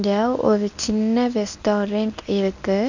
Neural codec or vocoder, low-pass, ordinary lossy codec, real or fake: codec, 24 kHz, 0.9 kbps, WavTokenizer, medium speech release version 1; 7.2 kHz; none; fake